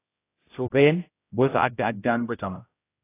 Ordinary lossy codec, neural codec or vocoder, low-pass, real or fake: AAC, 24 kbps; codec, 16 kHz, 0.5 kbps, X-Codec, HuBERT features, trained on general audio; 3.6 kHz; fake